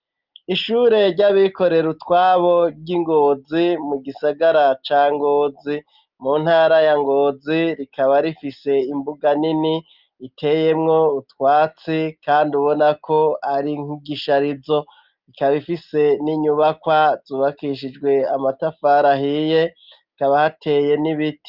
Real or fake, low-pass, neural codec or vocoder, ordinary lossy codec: real; 5.4 kHz; none; Opus, 24 kbps